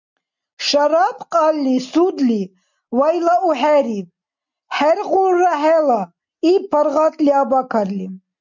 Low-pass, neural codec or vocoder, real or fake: 7.2 kHz; none; real